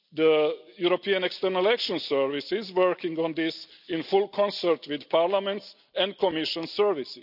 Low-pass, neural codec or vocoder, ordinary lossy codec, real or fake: 5.4 kHz; none; none; real